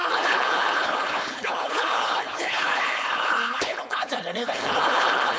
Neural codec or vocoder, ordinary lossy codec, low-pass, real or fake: codec, 16 kHz, 4.8 kbps, FACodec; none; none; fake